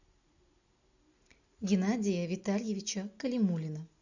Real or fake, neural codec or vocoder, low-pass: real; none; 7.2 kHz